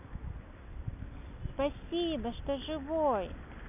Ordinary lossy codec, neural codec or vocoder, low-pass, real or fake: none; none; 3.6 kHz; real